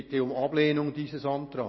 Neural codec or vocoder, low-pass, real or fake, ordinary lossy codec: none; 7.2 kHz; real; MP3, 24 kbps